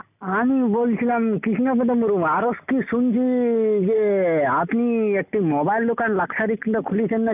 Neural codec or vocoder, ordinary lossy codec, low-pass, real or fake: none; none; 3.6 kHz; real